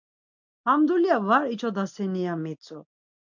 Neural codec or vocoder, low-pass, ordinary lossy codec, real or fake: none; 7.2 kHz; MP3, 64 kbps; real